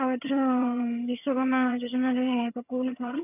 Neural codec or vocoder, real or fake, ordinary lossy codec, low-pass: vocoder, 22.05 kHz, 80 mel bands, HiFi-GAN; fake; AAC, 32 kbps; 3.6 kHz